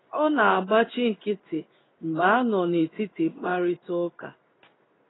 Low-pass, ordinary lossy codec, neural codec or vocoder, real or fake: 7.2 kHz; AAC, 16 kbps; codec, 16 kHz in and 24 kHz out, 1 kbps, XY-Tokenizer; fake